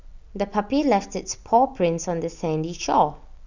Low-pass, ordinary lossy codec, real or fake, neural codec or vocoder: 7.2 kHz; none; real; none